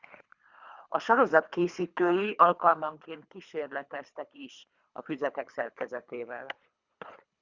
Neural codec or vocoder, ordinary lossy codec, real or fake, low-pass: codec, 24 kHz, 3 kbps, HILCodec; Opus, 64 kbps; fake; 7.2 kHz